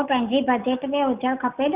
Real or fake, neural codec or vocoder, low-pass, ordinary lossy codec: real; none; 3.6 kHz; Opus, 64 kbps